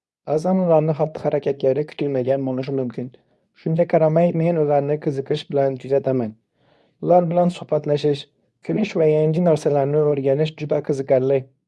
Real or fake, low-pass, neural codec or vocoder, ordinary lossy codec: fake; none; codec, 24 kHz, 0.9 kbps, WavTokenizer, medium speech release version 2; none